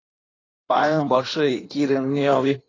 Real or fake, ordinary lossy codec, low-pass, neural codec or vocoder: fake; AAC, 32 kbps; 7.2 kHz; codec, 16 kHz in and 24 kHz out, 1.1 kbps, FireRedTTS-2 codec